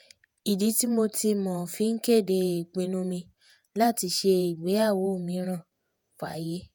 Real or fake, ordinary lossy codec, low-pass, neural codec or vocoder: fake; none; none; vocoder, 48 kHz, 128 mel bands, Vocos